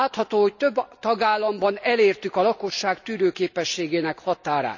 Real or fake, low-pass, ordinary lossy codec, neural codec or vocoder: real; 7.2 kHz; none; none